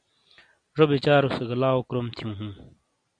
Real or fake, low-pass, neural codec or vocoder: real; 9.9 kHz; none